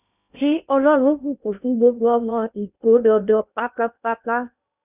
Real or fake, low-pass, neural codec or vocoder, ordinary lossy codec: fake; 3.6 kHz; codec, 16 kHz in and 24 kHz out, 0.8 kbps, FocalCodec, streaming, 65536 codes; none